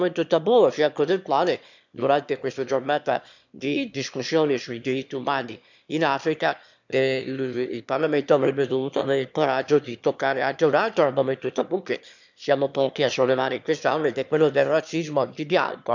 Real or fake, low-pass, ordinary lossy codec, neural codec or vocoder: fake; 7.2 kHz; none; autoencoder, 22.05 kHz, a latent of 192 numbers a frame, VITS, trained on one speaker